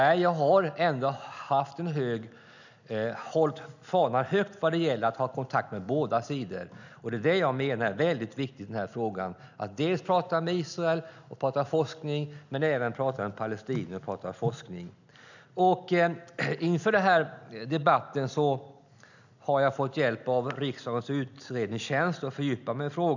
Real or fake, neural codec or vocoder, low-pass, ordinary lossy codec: real; none; 7.2 kHz; none